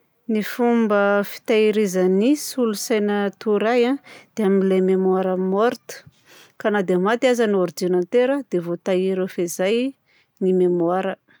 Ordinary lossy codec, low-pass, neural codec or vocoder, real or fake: none; none; none; real